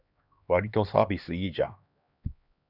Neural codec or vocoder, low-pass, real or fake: codec, 16 kHz, 2 kbps, X-Codec, HuBERT features, trained on LibriSpeech; 5.4 kHz; fake